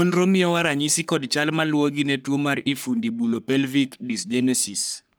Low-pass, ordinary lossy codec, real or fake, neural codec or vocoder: none; none; fake; codec, 44.1 kHz, 3.4 kbps, Pupu-Codec